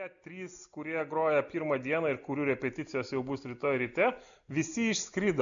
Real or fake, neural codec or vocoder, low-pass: real; none; 7.2 kHz